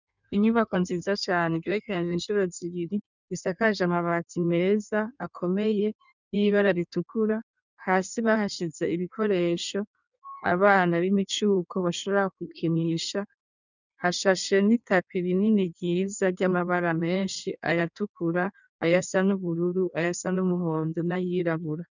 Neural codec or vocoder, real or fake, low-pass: codec, 16 kHz in and 24 kHz out, 1.1 kbps, FireRedTTS-2 codec; fake; 7.2 kHz